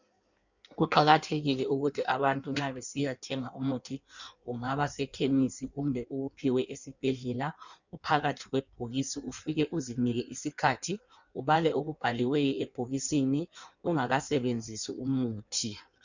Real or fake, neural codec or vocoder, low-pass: fake; codec, 16 kHz in and 24 kHz out, 1.1 kbps, FireRedTTS-2 codec; 7.2 kHz